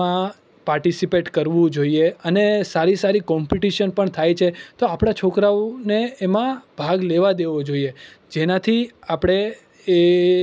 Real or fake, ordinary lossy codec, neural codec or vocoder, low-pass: real; none; none; none